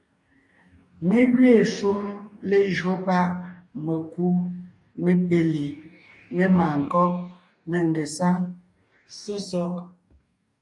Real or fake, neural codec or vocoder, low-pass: fake; codec, 44.1 kHz, 2.6 kbps, DAC; 10.8 kHz